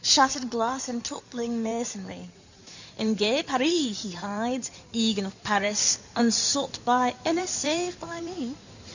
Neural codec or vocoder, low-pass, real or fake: codec, 16 kHz in and 24 kHz out, 2.2 kbps, FireRedTTS-2 codec; 7.2 kHz; fake